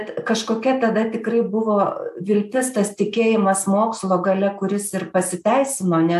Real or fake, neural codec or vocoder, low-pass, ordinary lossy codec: real; none; 14.4 kHz; AAC, 96 kbps